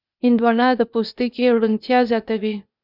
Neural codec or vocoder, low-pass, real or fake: codec, 16 kHz, 0.8 kbps, ZipCodec; 5.4 kHz; fake